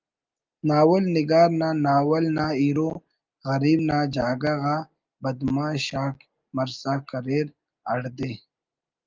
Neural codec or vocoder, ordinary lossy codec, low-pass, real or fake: none; Opus, 24 kbps; 7.2 kHz; real